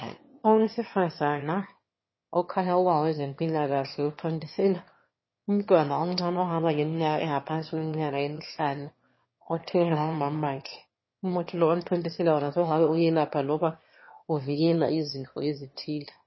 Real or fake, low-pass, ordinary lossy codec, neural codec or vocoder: fake; 7.2 kHz; MP3, 24 kbps; autoencoder, 22.05 kHz, a latent of 192 numbers a frame, VITS, trained on one speaker